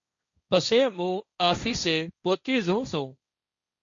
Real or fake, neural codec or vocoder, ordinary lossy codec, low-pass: fake; codec, 16 kHz, 1.1 kbps, Voila-Tokenizer; MP3, 64 kbps; 7.2 kHz